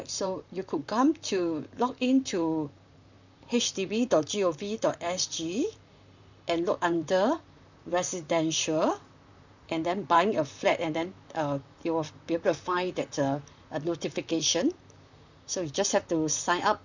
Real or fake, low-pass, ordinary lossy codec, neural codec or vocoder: fake; 7.2 kHz; none; vocoder, 44.1 kHz, 128 mel bands, Pupu-Vocoder